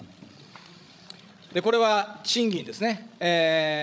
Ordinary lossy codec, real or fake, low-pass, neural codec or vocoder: none; fake; none; codec, 16 kHz, 16 kbps, FreqCodec, larger model